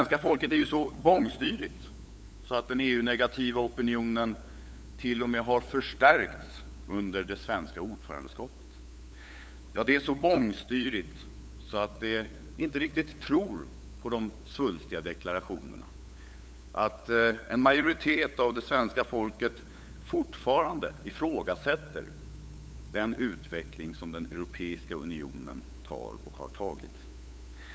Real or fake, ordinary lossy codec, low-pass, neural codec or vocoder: fake; none; none; codec, 16 kHz, 8 kbps, FunCodec, trained on LibriTTS, 25 frames a second